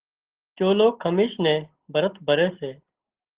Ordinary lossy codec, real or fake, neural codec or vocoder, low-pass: Opus, 16 kbps; real; none; 3.6 kHz